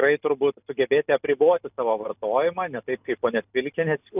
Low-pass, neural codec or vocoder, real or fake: 3.6 kHz; none; real